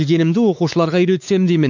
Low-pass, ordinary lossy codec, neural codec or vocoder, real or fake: 7.2 kHz; none; codec, 16 kHz, 4 kbps, X-Codec, WavLM features, trained on Multilingual LibriSpeech; fake